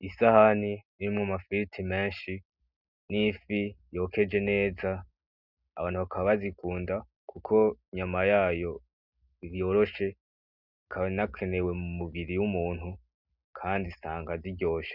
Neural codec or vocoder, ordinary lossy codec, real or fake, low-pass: none; Opus, 64 kbps; real; 5.4 kHz